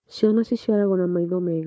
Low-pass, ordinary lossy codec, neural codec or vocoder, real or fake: none; none; codec, 16 kHz, 4 kbps, FunCodec, trained on Chinese and English, 50 frames a second; fake